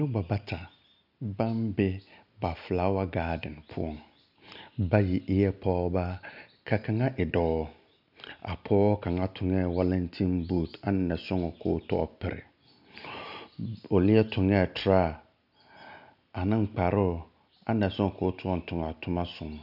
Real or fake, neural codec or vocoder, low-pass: real; none; 5.4 kHz